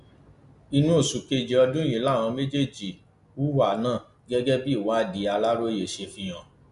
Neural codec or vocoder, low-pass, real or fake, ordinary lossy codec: none; 10.8 kHz; real; none